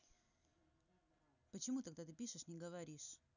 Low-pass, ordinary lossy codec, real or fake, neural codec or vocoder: 7.2 kHz; none; real; none